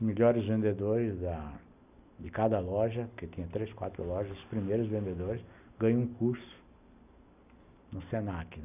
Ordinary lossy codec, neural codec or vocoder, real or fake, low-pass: none; none; real; 3.6 kHz